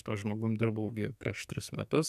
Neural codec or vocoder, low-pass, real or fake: codec, 44.1 kHz, 2.6 kbps, SNAC; 14.4 kHz; fake